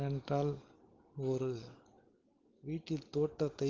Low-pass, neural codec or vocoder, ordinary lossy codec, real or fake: 7.2 kHz; none; Opus, 16 kbps; real